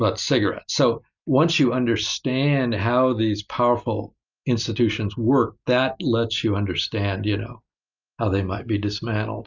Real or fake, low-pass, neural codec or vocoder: real; 7.2 kHz; none